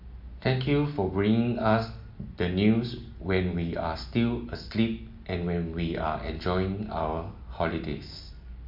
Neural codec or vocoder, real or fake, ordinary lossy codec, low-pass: none; real; MP3, 48 kbps; 5.4 kHz